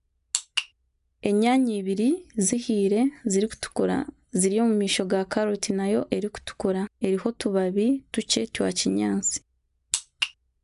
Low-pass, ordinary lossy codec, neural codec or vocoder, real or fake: 10.8 kHz; none; none; real